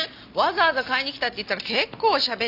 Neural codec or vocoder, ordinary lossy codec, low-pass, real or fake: none; AAC, 48 kbps; 5.4 kHz; real